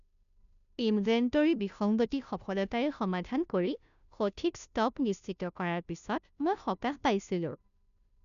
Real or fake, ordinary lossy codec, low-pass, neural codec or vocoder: fake; none; 7.2 kHz; codec, 16 kHz, 0.5 kbps, FunCodec, trained on Chinese and English, 25 frames a second